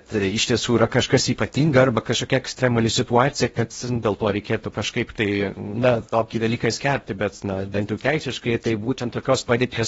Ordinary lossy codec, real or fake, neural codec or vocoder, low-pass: AAC, 24 kbps; fake; codec, 16 kHz in and 24 kHz out, 0.6 kbps, FocalCodec, streaming, 4096 codes; 10.8 kHz